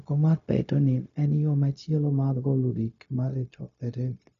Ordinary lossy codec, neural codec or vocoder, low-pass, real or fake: none; codec, 16 kHz, 0.4 kbps, LongCat-Audio-Codec; 7.2 kHz; fake